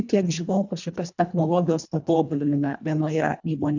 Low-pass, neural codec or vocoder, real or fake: 7.2 kHz; codec, 24 kHz, 1.5 kbps, HILCodec; fake